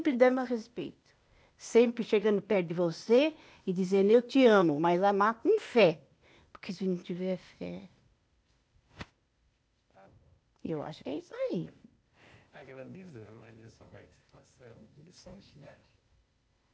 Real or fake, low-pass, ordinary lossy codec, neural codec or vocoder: fake; none; none; codec, 16 kHz, 0.8 kbps, ZipCodec